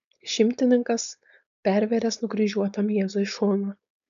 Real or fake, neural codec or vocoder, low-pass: fake; codec, 16 kHz, 4.8 kbps, FACodec; 7.2 kHz